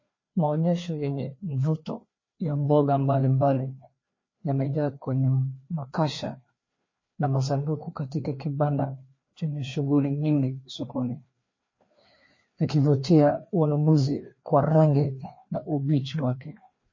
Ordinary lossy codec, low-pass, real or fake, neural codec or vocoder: MP3, 32 kbps; 7.2 kHz; fake; codec, 16 kHz, 2 kbps, FreqCodec, larger model